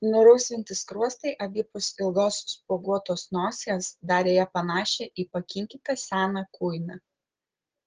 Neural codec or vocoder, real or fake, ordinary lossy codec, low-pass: none; real; Opus, 16 kbps; 7.2 kHz